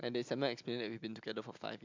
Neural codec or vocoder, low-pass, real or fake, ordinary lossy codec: none; 7.2 kHz; real; MP3, 64 kbps